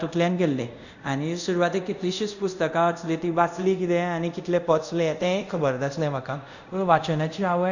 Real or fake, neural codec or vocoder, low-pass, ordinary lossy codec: fake; codec, 24 kHz, 0.5 kbps, DualCodec; 7.2 kHz; none